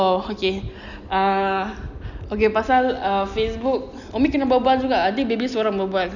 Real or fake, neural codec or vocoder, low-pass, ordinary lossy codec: real; none; 7.2 kHz; none